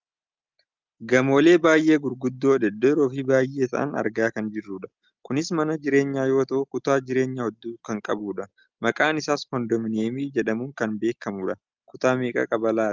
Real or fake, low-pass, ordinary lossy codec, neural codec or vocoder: real; 7.2 kHz; Opus, 32 kbps; none